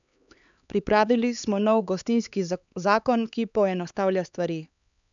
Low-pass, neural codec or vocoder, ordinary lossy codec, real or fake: 7.2 kHz; codec, 16 kHz, 4 kbps, X-Codec, HuBERT features, trained on LibriSpeech; none; fake